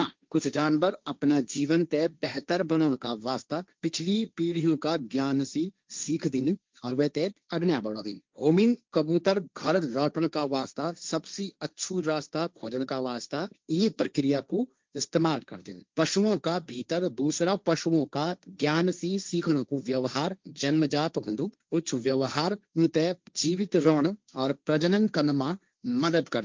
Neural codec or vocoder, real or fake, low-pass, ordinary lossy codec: codec, 16 kHz, 1.1 kbps, Voila-Tokenizer; fake; 7.2 kHz; Opus, 32 kbps